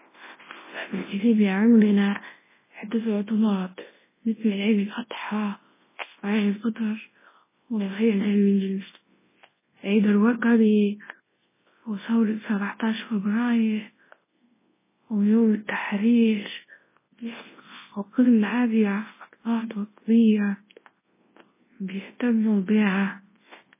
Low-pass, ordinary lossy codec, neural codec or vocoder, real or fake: 3.6 kHz; MP3, 16 kbps; codec, 24 kHz, 0.9 kbps, WavTokenizer, large speech release; fake